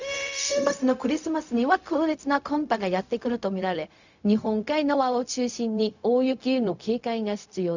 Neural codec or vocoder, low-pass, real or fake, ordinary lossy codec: codec, 16 kHz, 0.4 kbps, LongCat-Audio-Codec; 7.2 kHz; fake; none